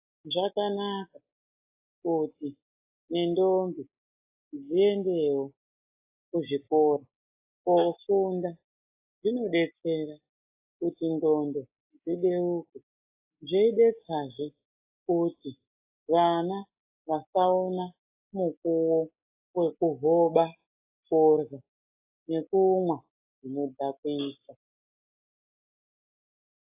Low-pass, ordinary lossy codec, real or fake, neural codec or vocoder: 3.6 kHz; AAC, 24 kbps; real; none